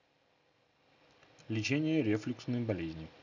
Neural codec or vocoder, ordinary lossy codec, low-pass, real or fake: none; none; 7.2 kHz; real